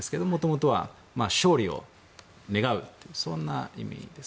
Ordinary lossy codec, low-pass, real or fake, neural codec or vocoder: none; none; real; none